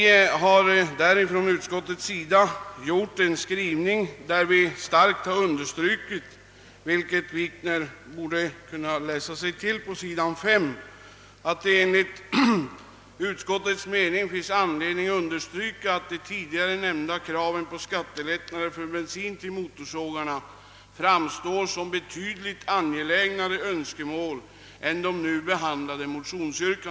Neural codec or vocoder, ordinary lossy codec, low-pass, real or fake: none; none; none; real